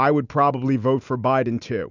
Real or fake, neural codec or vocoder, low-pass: real; none; 7.2 kHz